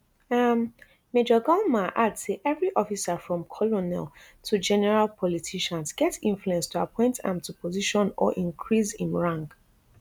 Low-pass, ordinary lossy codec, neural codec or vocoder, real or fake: none; none; none; real